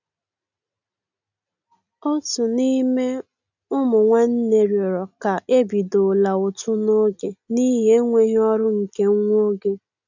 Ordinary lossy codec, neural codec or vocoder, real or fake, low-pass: none; none; real; 7.2 kHz